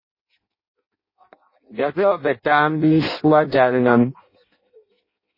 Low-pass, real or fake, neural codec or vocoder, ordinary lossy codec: 5.4 kHz; fake; codec, 16 kHz in and 24 kHz out, 0.6 kbps, FireRedTTS-2 codec; MP3, 24 kbps